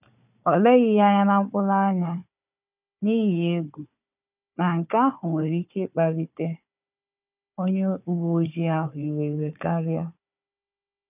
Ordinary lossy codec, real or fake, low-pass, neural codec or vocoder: none; fake; 3.6 kHz; codec, 16 kHz, 4 kbps, FunCodec, trained on Chinese and English, 50 frames a second